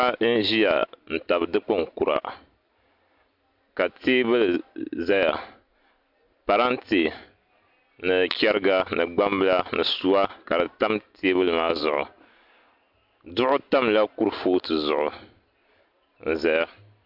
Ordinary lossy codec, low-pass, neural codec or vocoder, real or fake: MP3, 48 kbps; 5.4 kHz; none; real